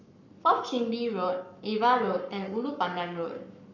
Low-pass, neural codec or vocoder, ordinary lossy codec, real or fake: 7.2 kHz; codec, 44.1 kHz, 7.8 kbps, Pupu-Codec; none; fake